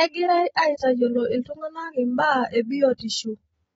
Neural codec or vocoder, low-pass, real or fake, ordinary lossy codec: vocoder, 44.1 kHz, 128 mel bands every 256 samples, BigVGAN v2; 19.8 kHz; fake; AAC, 24 kbps